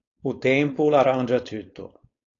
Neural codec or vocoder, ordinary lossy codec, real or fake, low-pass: codec, 16 kHz, 4.8 kbps, FACodec; AAC, 32 kbps; fake; 7.2 kHz